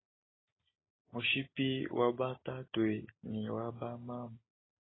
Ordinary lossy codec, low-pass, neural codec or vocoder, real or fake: AAC, 16 kbps; 7.2 kHz; none; real